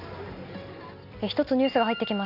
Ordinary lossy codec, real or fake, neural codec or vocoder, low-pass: none; real; none; 5.4 kHz